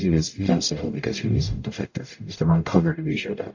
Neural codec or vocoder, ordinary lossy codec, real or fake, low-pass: codec, 44.1 kHz, 0.9 kbps, DAC; AAC, 48 kbps; fake; 7.2 kHz